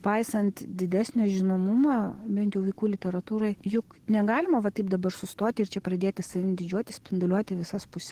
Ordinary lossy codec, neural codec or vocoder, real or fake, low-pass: Opus, 16 kbps; codec, 44.1 kHz, 7.8 kbps, DAC; fake; 14.4 kHz